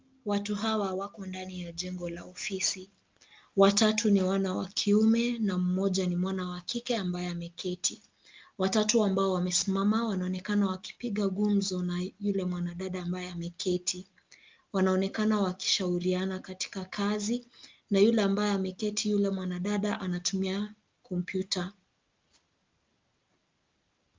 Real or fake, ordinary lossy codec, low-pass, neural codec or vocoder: real; Opus, 24 kbps; 7.2 kHz; none